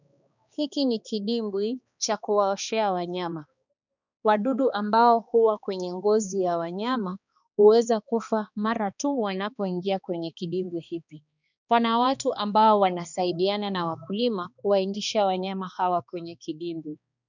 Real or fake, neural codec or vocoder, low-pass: fake; codec, 16 kHz, 2 kbps, X-Codec, HuBERT features, trained on balanced general audio; 7.2 kHz